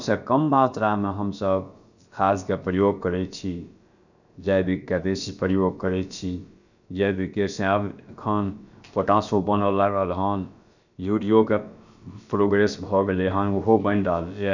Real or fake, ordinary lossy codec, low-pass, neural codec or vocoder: fake; none; 7.2 kHz; codec, 16 kHz, about 1 kbps, DyCAST, with the encoder's durations